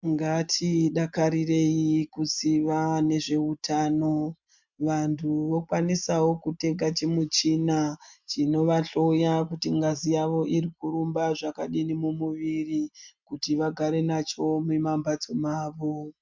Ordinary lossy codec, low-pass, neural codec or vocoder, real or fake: MP3, 64 kbps; 7.2 kHz; none; real